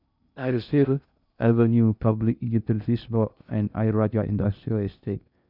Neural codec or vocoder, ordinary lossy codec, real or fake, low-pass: codec, 16 kHz in and 24 kHz out, 0.6 kbps, FocalCodec, streaming, 2048 codes; none; fake; 5.4 kHz